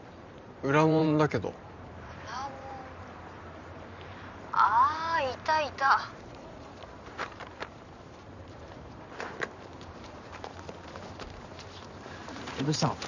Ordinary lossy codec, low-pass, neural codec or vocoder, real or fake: none; 7.2 kHz; vocoder, 44.1 kHz, 128 mel bands every 512 samples, BigVGAN v2; fake